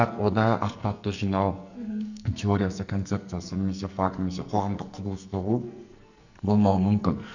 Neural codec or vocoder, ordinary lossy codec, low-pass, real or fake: codec, 44.1 kHz, 2.6 kbps, SNAC; none; 7.2 kHz; fake